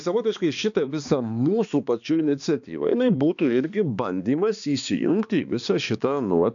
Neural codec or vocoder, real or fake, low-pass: codec, 16 kHz, 2 kbps, X-Codec, HuBERT features, trained on balanced general audio; fake; 7.2 kHz